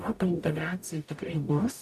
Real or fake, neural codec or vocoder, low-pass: fake; codec, 44.1 kHz, 0.9 kbps, DAC; 14.4 kHz